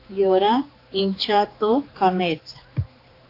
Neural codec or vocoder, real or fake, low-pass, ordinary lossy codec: codec, 16 kHz, 2 kbps, X-Codec, HuBERT features, trained on general audio; fake; 5.4 kHz; AAC, 32 kbps